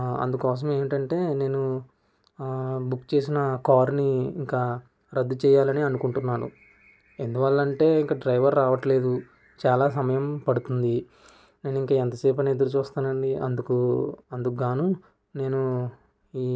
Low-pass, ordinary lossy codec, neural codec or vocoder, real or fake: none; none; none; real